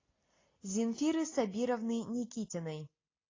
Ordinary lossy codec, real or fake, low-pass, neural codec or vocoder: AAC, 32 kbps; real; 7.2 kHz; none